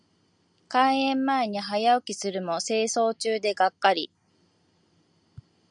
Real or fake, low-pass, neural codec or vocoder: real; 9.9 kHz; none